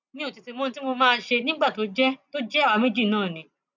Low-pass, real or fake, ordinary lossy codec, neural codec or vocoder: 7.2 kHz; real; none; none